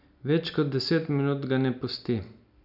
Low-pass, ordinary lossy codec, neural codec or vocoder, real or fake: 5.4 kHz; none; none; real